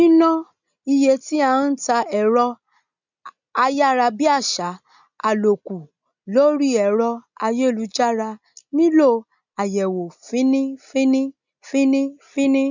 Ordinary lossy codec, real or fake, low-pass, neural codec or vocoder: none; real; 7.2 kHz; none